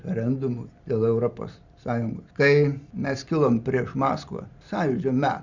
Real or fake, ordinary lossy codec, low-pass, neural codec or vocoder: real; Opus, 64 kbps; 7.2 kHz; none